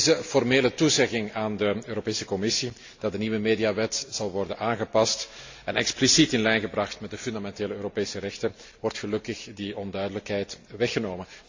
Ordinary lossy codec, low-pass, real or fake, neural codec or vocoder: AAC, 48 kbps; 7.2 kHz; real; none